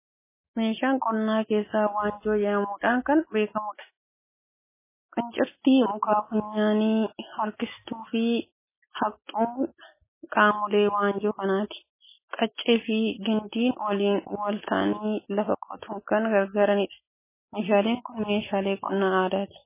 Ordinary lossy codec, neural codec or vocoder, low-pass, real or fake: MP3, 16 kbps; none; 3.6 kHz; real